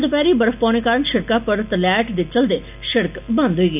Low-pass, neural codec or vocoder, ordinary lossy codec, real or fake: 3.6 kHz; none; none; real